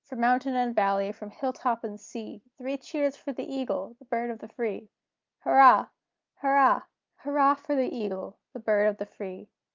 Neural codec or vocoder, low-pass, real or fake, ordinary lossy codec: none; 7.2 kHz; real; Opus, 24 kbps